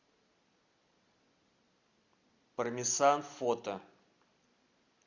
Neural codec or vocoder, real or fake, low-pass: none; real; 7.2 kHz